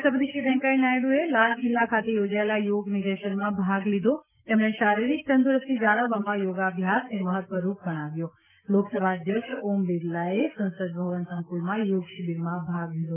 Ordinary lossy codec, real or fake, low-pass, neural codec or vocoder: none; fake; 3.6 kHz; codec, 16 kHz, 6 kbps, DAC